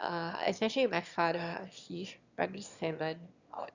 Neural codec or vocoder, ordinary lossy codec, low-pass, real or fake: autoencoder, 22.05 kHz, a latent of 192 numbers a frame, VITS, trained on one speaker; Opus, 64 kbps; 7.2 kHz; fake